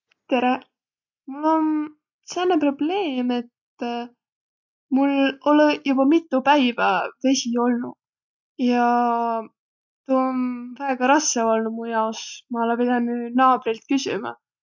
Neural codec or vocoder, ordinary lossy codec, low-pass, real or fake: none; none; 7.2 kHz; real